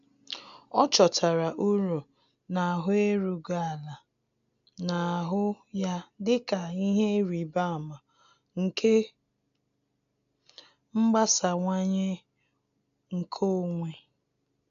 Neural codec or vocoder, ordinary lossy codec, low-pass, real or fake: none; none; 7.2 kHz; real